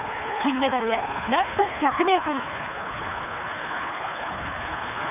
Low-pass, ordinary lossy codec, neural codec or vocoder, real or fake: 3.6 kHz; none; codec, 24 kHz, 3 kbps, HILCodec; fake